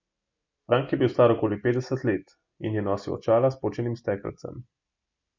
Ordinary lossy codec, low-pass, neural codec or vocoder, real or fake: MP3, 64 kbps; 7.2 kHz; none; real